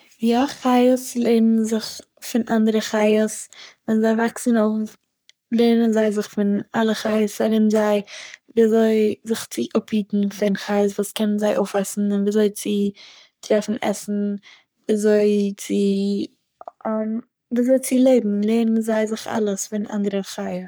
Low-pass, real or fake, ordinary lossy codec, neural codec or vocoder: none; fake; none; codec, 44.1 kHz, 3.4 kbps, Pupu-Codec